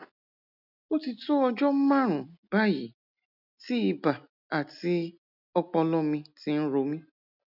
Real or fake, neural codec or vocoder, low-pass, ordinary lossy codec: real; none; 5.4 kHz; none